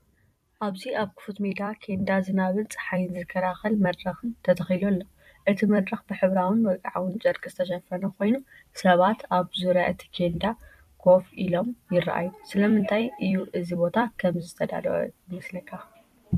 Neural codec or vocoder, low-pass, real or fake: vocoder, 44.1 kHz, 128 mel bands every 256 samples, BigVGAN v2; 14.4 kHz; fake